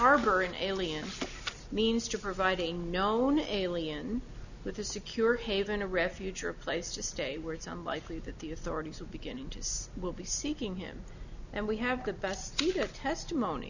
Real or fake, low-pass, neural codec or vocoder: real; 7.2 kHz; none